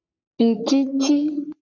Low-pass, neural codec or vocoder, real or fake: 7.2 kHz; codec, 44.1 kHz, 3.4 kbps, Pupu-Codec; fake